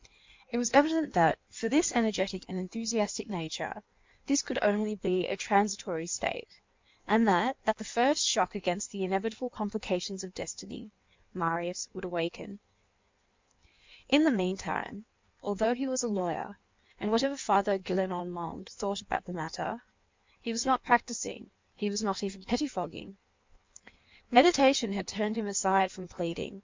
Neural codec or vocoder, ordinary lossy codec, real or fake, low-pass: codec, 16 kHz in and 24 kHz out, 1.1 kbps, FireRedTTS-2 codec; MP3, 64 kbps; fake; 7.2 kHz